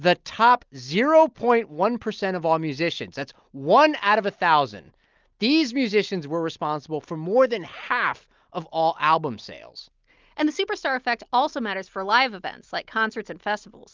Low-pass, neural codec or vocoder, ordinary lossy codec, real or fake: 7.2 kHz; none; Opus, 24 kbps; real